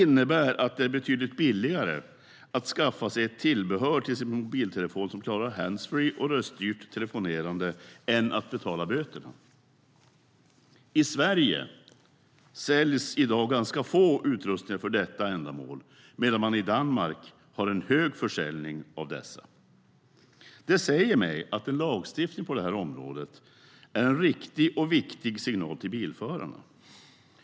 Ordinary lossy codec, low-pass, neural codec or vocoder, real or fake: none; none; none; real